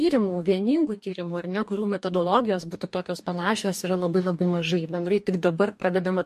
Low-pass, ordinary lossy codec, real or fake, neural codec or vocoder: 14.4 kHz; MP3, 64 kbps; fake; codec, 44.1 kHz, 2.6 kbps, DAC